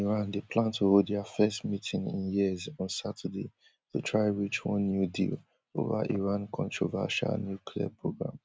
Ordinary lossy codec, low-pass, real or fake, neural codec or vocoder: none; none; real; none